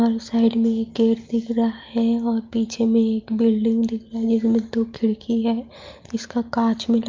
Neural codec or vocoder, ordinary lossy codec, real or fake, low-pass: none; Opus, 24 kbps; real; 7.2 kHz